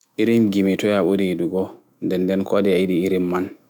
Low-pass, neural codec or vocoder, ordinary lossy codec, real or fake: none; autoencoder, 48 kHz, 128 numbers a frame, DAC-VAE, trained on Japanese speech; none; fake